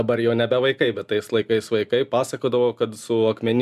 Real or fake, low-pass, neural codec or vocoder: real; 14.4 kHz; none